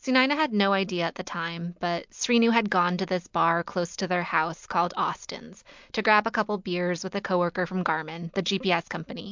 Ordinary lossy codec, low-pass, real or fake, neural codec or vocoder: MP3, 64 kbps; 7.2 kHz; real; none